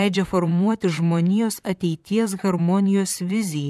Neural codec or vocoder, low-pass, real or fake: vocoder, 44.1 kHz, 128 mel bands, Pupu-Vocoder; 14.4 kHz; fake